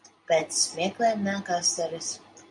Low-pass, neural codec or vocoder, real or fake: 10.8 kHz; none; real